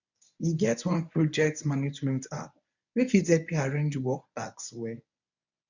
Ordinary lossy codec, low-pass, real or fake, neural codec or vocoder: none; 7.2 kHz; fake; codec, 24 kHz, 0.9 kbps, WavTokenizer, medium speech release version 1